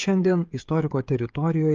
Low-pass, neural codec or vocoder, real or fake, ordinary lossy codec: 7.2 kHz; codec, 16 kHz, 16 kbps, FreqCodec, smaller model; fake; Opus, 24 kbps